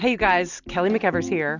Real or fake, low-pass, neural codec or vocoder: real; 7.2 kHz; none